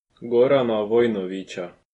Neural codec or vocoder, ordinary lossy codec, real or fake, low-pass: none; AAC, 32 kbps; real; 10.8 kHz